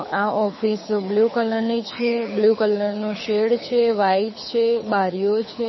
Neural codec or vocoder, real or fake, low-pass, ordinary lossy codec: codec, 24 kHz, 6 kbps, HILCodec; fake; 7.2 kHz; MP3, 24 kbps